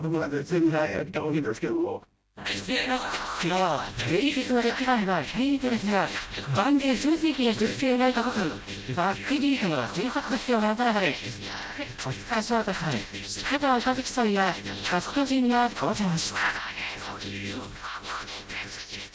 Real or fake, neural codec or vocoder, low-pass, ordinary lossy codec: fake; codec, 16 kHz, 0.5 kbps, FreqCodec, smaller model; none; none